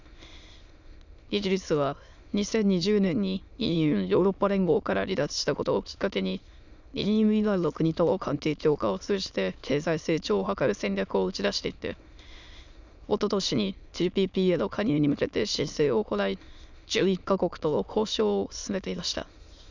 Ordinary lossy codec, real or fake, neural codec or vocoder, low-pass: none; fake; autoencoder, 22.05 kHz, a latent of 192 numbers a frame, VITS, trained on many speakers; 7.2 kHz